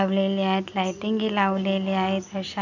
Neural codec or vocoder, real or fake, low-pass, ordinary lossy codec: none; real; 7.2 kHz; none